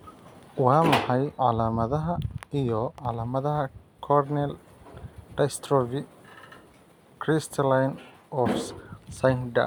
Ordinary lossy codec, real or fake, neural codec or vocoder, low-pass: none; real; none; none